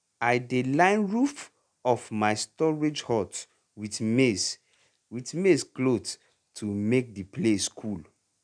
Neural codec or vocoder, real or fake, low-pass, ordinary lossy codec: none; real; 9.9 kHz; none